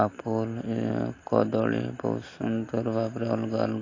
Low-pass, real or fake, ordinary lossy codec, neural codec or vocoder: 7.2 kHz; real; AAC, 32 kbps; none